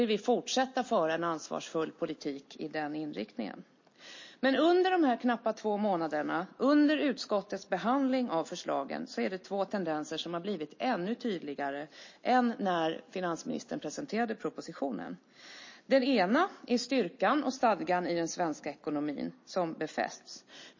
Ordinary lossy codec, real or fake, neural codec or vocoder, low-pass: MP3, 32 kbps; real; none; 7.2 kHz